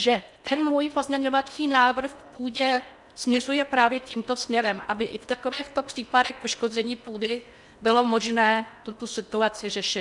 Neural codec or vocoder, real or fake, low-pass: codec, 16 kHz in and 24 kHz out, 0.8 kbps, FocalCodec, streaming, 65536 codes; fake; 10.8 kHz